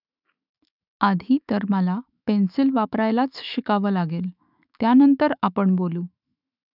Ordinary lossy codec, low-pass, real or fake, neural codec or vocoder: none; 5.4 kHz; fake; autoencoder, 48 kHz, 128 numbers a frame, DAC-VAE, trained on Japanese speech